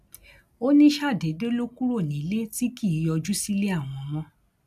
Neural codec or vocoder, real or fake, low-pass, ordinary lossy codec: none; real; 14.4 kHz; none